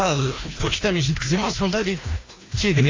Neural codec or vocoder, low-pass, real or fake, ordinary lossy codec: codec, 16 kHz, 1 kbps, FreqCodec, larger model; 7.2 kHz; fake; AAC, 32 kbps